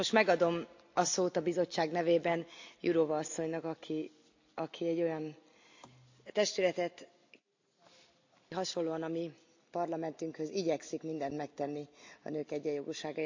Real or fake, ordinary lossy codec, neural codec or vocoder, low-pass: real; AAC, 48 kbps; none; 7.2 kHz